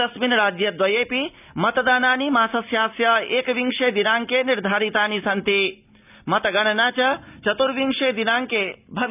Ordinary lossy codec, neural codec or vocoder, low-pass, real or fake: none; none; 3.6 kHz; real